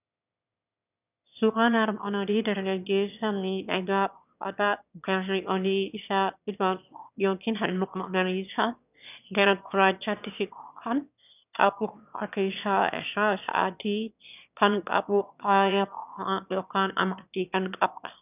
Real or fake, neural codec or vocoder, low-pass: fake; autoencoder, 22.05 kHz, a latent of 192 numbers a frame, VITS, trained on one speaker; 3.6 kHz